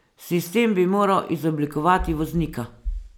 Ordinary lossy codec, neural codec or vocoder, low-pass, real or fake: none; none; 19.8 kHz; real